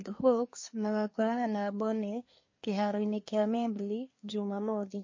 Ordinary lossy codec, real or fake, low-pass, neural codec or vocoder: MP3, 32 kbps; fake; 7.2 kHz; codec, 24 kHz, 1 kbps, SNAC